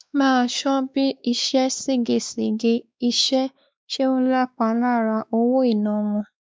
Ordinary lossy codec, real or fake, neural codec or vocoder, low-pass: none; fake; codec, 16 kHz, 2 kbps, X-Codec, WavLM features, trained on Multilingual LibriSpeech; none